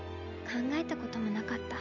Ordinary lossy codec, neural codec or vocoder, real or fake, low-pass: none; none; real; 7.2 kHz